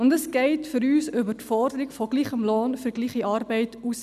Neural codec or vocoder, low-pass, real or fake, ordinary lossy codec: none; 14.4 kHz; real; none